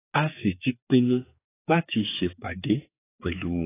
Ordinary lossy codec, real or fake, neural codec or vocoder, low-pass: AAC, 24 kbps; fake; codec, 16 kHz, 4 kbps, X-Codec, HuBERT features, trained on general audio; 3.6 kHz